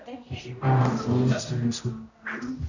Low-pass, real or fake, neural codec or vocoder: 7.2 kHz; fake; codec, 16 kHz, 0.5 kbps, X-Codec, HuBERT features, trained on general audio